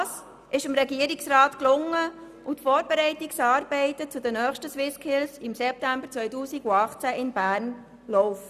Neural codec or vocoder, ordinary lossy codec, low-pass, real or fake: none; none; 14.4 kHz; real